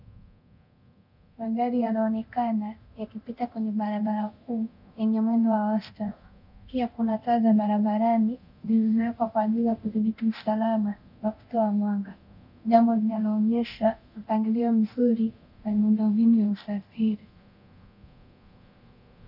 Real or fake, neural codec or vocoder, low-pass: fake; codec, 24 kHz, 0.9 kbps, DualCodec; 5.4 kHz